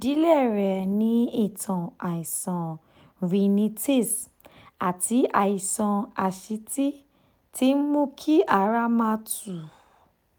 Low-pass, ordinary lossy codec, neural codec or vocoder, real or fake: none; none; none; real